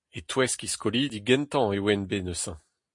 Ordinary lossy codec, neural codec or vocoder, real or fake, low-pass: MP3, 48 kbps; none; real; 10.8 kHz